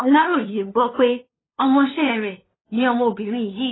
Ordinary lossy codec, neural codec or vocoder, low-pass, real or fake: AAC, 16 kbps; codec, 16 kHz, 2 kbps, FunCodec, trained on LibriTTS, 25 frames a second; 7.2 kHz; fake